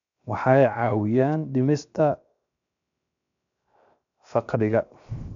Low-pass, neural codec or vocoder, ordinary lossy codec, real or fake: 7.2 kHz; codec, 16 kHz, 0.7 kbps, FocalCodec; MP3, 96 kbps; fake